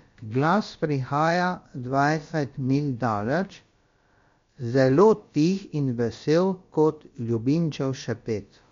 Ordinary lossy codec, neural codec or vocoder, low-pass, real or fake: MP3, 48 kbps; codec, 16 kHz, about 1 kbps, DyCAST, with the encoder's durations; 7.2 kHz; fake